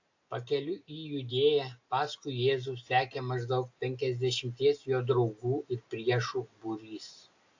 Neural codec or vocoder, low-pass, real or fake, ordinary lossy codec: none; 7.2 kHz; real; AAC, 48 kbps